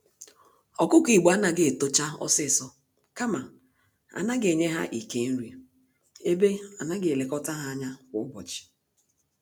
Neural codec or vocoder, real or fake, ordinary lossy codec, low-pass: none; real; none; none